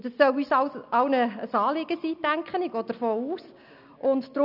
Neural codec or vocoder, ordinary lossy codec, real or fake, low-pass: none; none; real; 5.4 kHz